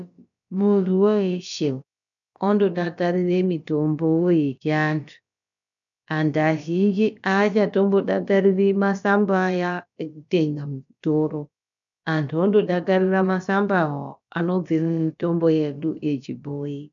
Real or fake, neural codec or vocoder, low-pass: fake; codec, 16 kHz, about 1 kbps, DyCAST, with the encoder's durations; 7.2 kHz